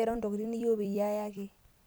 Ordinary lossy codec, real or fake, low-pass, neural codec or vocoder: none; fake; none; vocoder, 44.1 kHz, 128 mel bands every 256 samples, BigVGAN v2